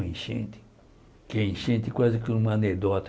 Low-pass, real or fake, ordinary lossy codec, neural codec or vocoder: none; real; none; none